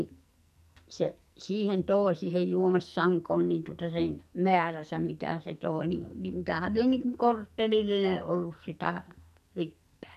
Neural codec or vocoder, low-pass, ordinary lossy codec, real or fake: codec, 44.1 kHz, 2.6 kbps, SNAC; 14.4 kHz; none; fake